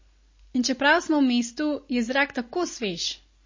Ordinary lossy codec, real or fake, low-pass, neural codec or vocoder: MP3, 32 kbps; real; 7.2 kHz; none